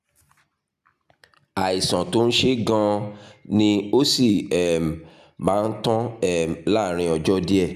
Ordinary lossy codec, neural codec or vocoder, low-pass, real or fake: none; none; 14.4 kHz; real